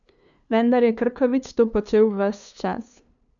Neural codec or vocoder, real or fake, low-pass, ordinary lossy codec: codec, 16 kHz, 2 kbps, FunCodec, trained on LibriTTS, 25 frames a second; fake; 7.2 kHz; none